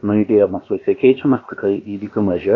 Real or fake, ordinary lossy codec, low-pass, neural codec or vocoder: fake; AAC, 32 kbps; 7.2 kHz; codec, 16 kHz, about 1 kbps, DyCAST, with the encoder's durations